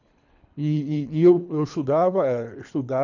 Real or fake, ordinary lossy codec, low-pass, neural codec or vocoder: fake; none; 7.2 kHz; codec, 24 kHz, 3 kbps, HILCodec